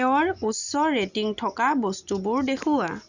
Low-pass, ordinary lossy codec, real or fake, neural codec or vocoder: 7.2 kHz; Opus, 64 kbps; real; none